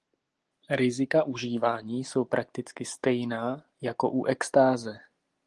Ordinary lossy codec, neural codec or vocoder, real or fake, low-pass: Opus, 24 kbps; none; real; 10.8 kHz